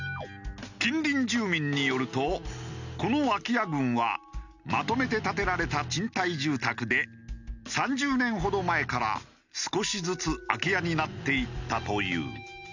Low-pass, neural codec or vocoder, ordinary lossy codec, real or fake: 7.2 kHz; none; none; real